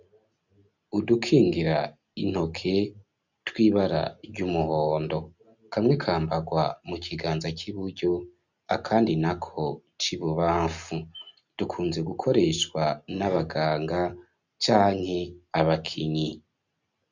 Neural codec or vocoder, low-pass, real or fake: none; 7.2 kHz; real